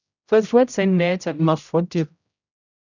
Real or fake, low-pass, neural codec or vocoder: fake; 7.2 kHz; codec, 16 kHz, 0.5 kbps, X-Codec, HuBERT features, trained on general audio